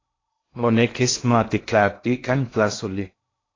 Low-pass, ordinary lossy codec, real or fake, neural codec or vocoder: 7.2 kHz; AAC, 32 kbps; fake; codec, 16 kHz in and 24 kHz out, 0.6 kbps, FocalCodec, streaming, 2048 codes